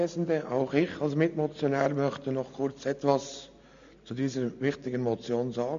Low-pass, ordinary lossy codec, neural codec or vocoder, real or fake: 7.2 kHz; AAC, 64 kbps; none; real